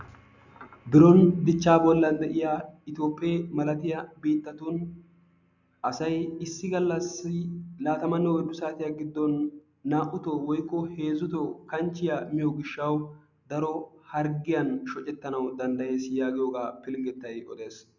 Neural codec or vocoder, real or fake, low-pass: none; real; 7.2 kHz